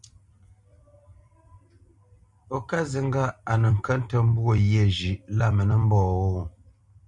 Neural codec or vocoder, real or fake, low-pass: vocoder, 44.1 kHz, 128 mel bands every 256 samples, BigVGAN v2; fake; 10.8 kHz